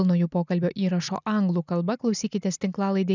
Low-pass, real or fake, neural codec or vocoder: 7.2 kHz; real; none